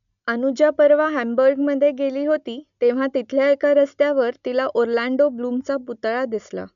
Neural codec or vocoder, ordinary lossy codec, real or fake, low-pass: none; none; real; 7.2 kHz